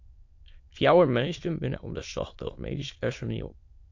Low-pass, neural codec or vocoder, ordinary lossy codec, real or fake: 7.2 kHz; autoencoder, 22.05 kHz, a latent of 192 numbers a frame, VITS, trained on many speakers; MP3, 48 kbps; fake